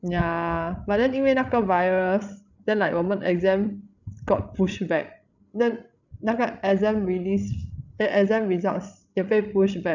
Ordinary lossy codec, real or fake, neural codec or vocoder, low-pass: none; fake; codec, 16 kHz, 16 kbps, FreqCodec, larger model; 7.2 kHz